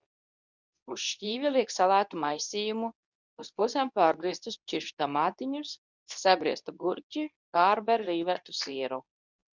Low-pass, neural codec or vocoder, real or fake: 7.2 kHz; codec, 24 kHz, 0.9 kbps, WavTokenizer, medium speech release version 2; fake